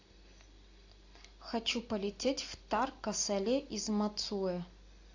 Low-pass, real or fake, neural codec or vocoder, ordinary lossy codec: 7.2 kHz; real; none; AAC, 48 kbps